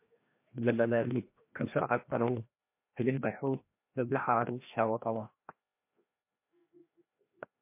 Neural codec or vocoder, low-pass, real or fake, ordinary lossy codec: codec, 16 kHz, 1 kbps, FreqCodec, larger model; 3.6 kHz; fake; MP3, 24 kbps